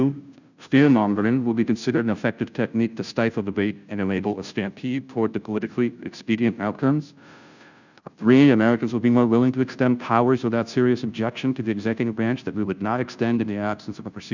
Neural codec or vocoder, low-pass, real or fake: codec, 16 kHz, 0.5 kbps, FunCodec, trained on Chinese and English, 25 frames a second; 7.2 kHz; fake